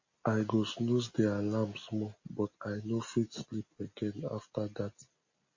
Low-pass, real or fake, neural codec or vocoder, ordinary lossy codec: 7.2 kHz; real; none; MP3, 32 kbps